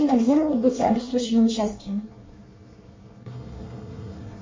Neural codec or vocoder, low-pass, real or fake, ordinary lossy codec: codec, 24 kHz, 1 kbps, SNAC; 7.2 kHz; fake; MP3, 32 kbps